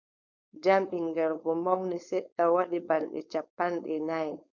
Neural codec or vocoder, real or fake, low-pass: codec, 16 kHz, 4.8 kbps, FACodec; fake; 7.2 kHz